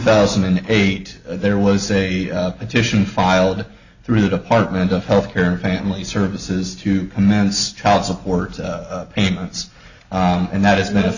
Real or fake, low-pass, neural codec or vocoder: real; 7.2 kHz; none